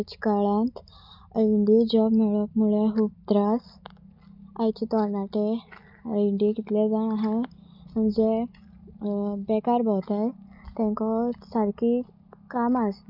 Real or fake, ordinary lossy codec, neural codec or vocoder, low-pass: real; none; none; 5.4 kHz